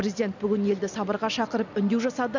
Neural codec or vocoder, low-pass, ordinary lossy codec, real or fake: none; 7.2 kHz; none; real